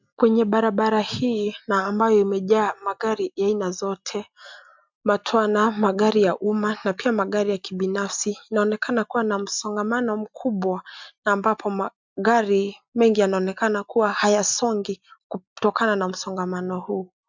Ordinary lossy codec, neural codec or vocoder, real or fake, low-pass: MP3, 64 kbps; none; real; 7.2 kHz